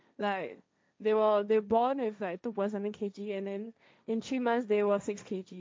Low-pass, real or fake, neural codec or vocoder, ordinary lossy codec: none; fake; codec, 16 kHz, 1.1 kbps, Voila-Tokenizer; none